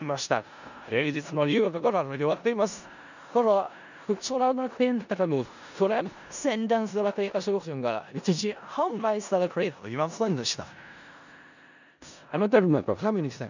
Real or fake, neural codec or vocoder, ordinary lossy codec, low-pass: fake; codec, 16 kHz in and 24 kHz out, 0.4 kbps, LongCat-Audio-Codec, four codebook decoder; none; 7.2 kHz